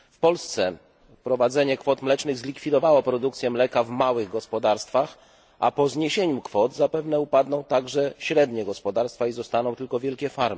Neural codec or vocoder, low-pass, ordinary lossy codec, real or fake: none; none; none; real